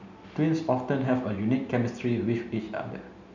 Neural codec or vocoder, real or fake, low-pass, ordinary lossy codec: none; real; 7.2 kHz; none